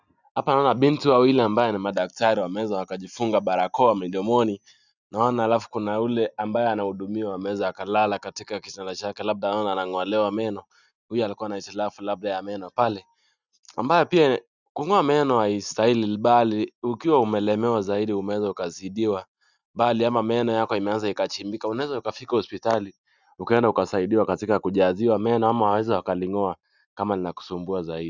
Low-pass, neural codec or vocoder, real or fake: 7.2 kHz; none; real